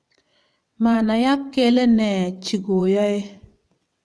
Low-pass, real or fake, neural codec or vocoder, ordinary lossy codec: none; fake; vocoder, 22.05 kHz, 80 mel bands, WaveNeXt; none